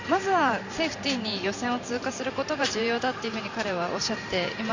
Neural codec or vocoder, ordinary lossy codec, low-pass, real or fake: vocoder, 44.1 kHz, 128 mel bands every 512 samples, BigVGAN v2; Opus, 64 kbps; 7.2 kHz; fake